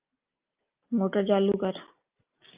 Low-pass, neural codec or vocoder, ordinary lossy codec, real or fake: 3.6 kHz; none; Opus, 24 kbps; real